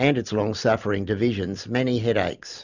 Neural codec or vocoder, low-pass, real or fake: none; 7.2 kHz; real